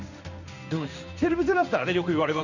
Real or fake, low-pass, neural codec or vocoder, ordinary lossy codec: fake; 7.2 kHz; codec, 16 kHz in and 24 kHz out, 1 kbps, XY-Tokenizer; none